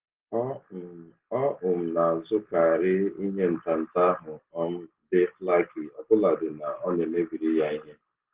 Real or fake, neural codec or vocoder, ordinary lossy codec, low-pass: real; none; Opus, 16 kbps; 3.6 kHz